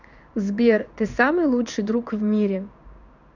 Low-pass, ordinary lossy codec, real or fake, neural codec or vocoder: 7.2 kHz; none; fake; codec, 16 kHz in and 24 kHz out, 1 kbps, XY-Tokenizer